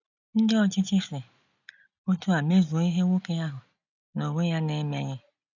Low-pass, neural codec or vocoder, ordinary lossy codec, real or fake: 7.2 kHz; none; none; real